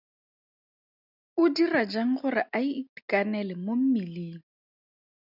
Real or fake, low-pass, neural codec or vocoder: real; 5.4 kHz; none